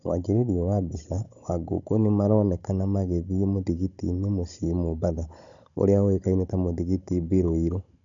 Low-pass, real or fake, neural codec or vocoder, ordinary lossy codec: 7.2 kHz; fake; codec, 16 kHz, 16 kbps, FunCodec, trained on Chinese and English, 50 frames a second; none